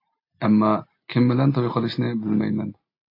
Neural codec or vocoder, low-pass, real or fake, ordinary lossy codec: none; 5.4 kHz; real; AAC, 32 kbps